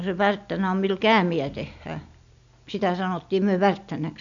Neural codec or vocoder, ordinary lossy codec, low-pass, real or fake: none; none; 7.2 kHz; real